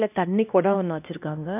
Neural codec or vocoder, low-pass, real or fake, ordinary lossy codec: codec, 16 kHz, 1 kbps, X-Codec, HuBERT features, trained on LibriSpeech; 3.6 kHz; fake; none